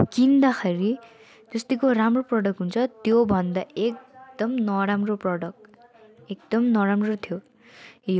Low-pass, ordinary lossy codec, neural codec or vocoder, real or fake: none; none; none; real